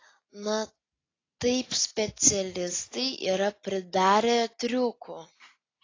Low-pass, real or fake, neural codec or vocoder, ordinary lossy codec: 7.2 kHz; real; none; AAC, 32 kbps